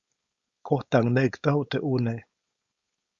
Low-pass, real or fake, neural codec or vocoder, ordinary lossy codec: 7.2 kHz; fake; codec, 16 kHz, 4.8 kbps, FACodec; Opus, 64 kbps